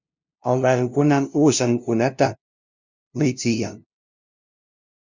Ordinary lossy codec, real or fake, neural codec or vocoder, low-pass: Opus, 64 kbps; fake; codec, 16 kHz, 0.5 kbps, FunCodec, trained on LibriTTS, 25 frames a second; 7.2 kHz